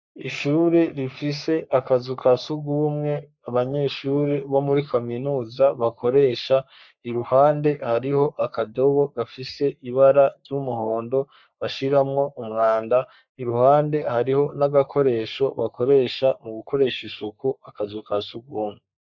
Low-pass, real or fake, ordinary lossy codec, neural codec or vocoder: 7.2 kHz; fake; MP3, 64 kbps; codec, 44.1 kHz, 3.4 kbps, Pupu-Codec